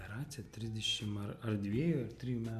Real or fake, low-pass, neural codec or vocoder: real; 14.4 kHz; none